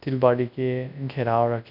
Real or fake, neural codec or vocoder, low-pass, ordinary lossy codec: fake; codec, 24 kHz, 0.9 kbps, WavTokenizer, large speech release; 5.4 kHz; none